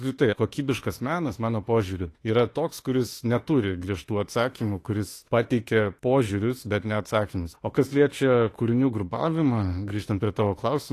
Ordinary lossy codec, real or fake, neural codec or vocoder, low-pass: AAC, 48 kbps; fake; autoencoder, 48 kHz, 32 numbers a frame, DAC-VAE, trained on Japanese speech; 14.4 kHz